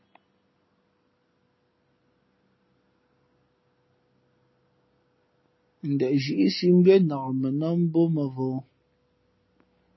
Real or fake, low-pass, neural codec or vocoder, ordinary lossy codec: real; 7.2 kHz; none; MP3, 24 kbps